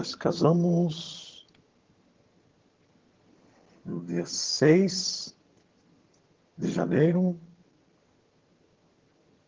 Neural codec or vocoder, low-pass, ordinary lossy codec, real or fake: vocoder, 22.05 kHz, 80 mel bands, HiFi-GAN; 7.2 kHz; Opus, 16 kbps; fake